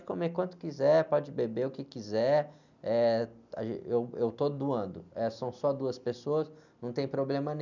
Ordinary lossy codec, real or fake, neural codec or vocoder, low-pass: none; real; none; 7.2 kHz